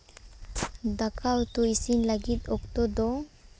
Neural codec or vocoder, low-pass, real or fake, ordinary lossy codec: none; none; real; none